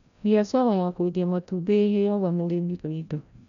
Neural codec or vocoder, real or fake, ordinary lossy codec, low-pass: codec, 16 kHz, 0.5 kbps, FreqCodec, larger model; fake; MP3, 96 kbps; 7.2 kHz